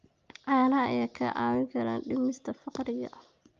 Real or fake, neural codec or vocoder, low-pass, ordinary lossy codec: real; none; 7.2 kHz; Opus, 32 kbps